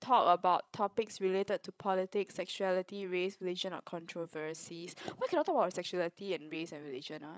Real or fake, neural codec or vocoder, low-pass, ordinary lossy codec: fake; codec, 16 kHz, 16 kbps, FreqCodec, larger model; none; none